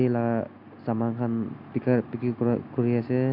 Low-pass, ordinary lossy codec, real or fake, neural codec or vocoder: 5.4 kHz; none; real; none